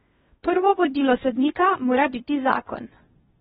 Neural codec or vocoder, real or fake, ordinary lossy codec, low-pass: codec, 16 kHz in and 24 kHz out, 0.8 kbps, FocalCodec, streaming, 65536 codes; fake; AAC, 16 kbps; 10.8 kHz